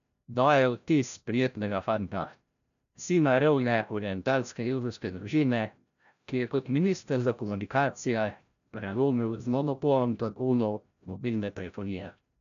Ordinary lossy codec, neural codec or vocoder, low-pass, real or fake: none; codec, 16 kHz, 0.5 kbps, FreqCodec, larger model; 7.2 kHz; fake